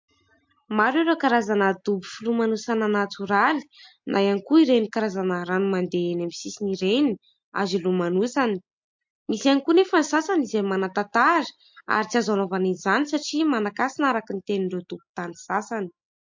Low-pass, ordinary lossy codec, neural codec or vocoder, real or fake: 7.2 kHz; MP3, 48 kbps; none; real